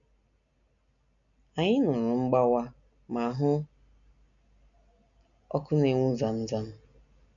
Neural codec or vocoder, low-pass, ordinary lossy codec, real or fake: none; 7.2 kHz; none; real